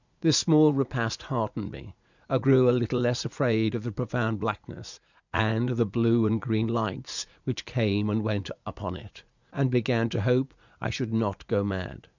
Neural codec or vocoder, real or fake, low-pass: vocoder, 44.1 kHz, 80 mel bands, Vocos; fake; 7.2 kHz